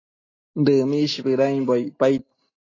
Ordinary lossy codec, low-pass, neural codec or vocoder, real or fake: AAC, 32 kbps; 7.2 kHz; none; real